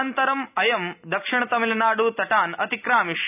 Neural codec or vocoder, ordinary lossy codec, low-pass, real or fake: none; none; 3.6 kHz; real